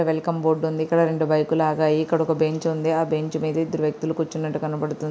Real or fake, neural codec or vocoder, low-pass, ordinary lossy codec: real; none; none; none